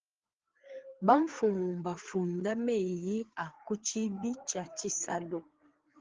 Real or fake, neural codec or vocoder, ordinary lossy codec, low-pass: fake; codec, 16 kHz, 4 kbps, FreqCodec, larger model; Opus, 16 kbps; 7.2 kHz